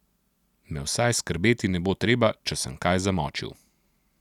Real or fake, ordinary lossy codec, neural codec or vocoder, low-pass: real; none; none; 19.8 kHz